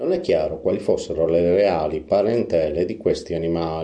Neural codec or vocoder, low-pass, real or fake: none; 9.9 kHz; real